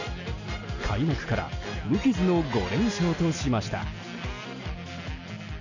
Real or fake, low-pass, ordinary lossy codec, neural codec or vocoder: real; 7.2 kHz; none; none